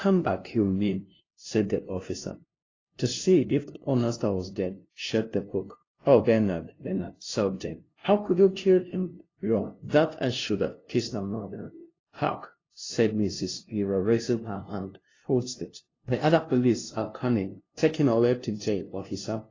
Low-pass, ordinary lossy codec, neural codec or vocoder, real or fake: 7.2 kHz; AAC, 32 kbps; codec, 16 kHz, 0.5 kbps, FunCodec, trained on LibriTTS, 25 frames a second; fake